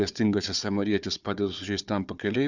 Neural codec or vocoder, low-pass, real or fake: codec, 16 kHz, 4 kbps, FunCodec, trained on Chinese and English, 50 frames a second; 7.2 kHz; fake